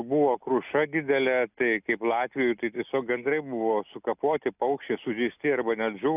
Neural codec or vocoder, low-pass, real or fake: none; 3.6 kHz; real